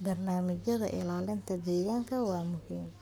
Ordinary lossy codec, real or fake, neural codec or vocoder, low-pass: none; fake; codec, 44.1 kHz, 7.8 kbps, Pupu-Codec; none